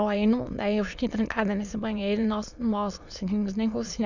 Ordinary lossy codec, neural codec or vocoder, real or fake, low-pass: none; autoencoder, 22.05 kHz, a latent of 192 numbers a frame, VITS, trained on many speakers; fake; 7.2 kHz